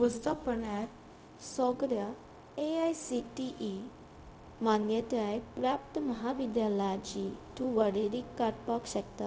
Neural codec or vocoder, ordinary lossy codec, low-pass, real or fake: codec, 16 kHz, 0.4 kbps, LongCat-Audio-Codec; none; none; fake